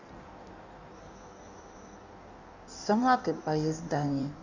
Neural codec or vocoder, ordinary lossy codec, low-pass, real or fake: codec, 16 kHz in and 24 kHz out, 1.1 kbps, FireRedTTS-2 codec; none; 7.2 kHz; fake